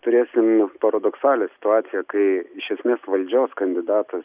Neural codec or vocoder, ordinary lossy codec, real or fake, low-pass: none; Opus, 64 kbps; real; 3.6 kHz